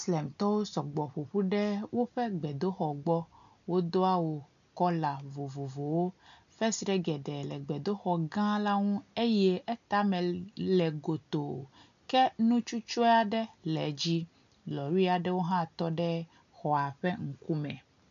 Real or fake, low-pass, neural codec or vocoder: real; 7.2 kHz; none